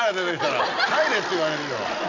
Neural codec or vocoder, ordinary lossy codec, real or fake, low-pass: none; none; real; 7.2 kHz